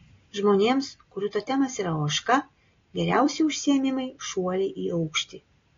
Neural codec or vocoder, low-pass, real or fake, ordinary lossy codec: none; 7.2 kHz; real; AAC, 32 kbps